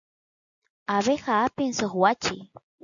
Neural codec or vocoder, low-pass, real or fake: none; 7.2 kHz; real